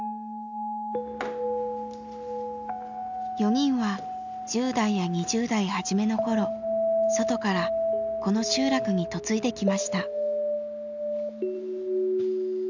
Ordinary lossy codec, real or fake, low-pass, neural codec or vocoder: none; real; 7.2 kHz; none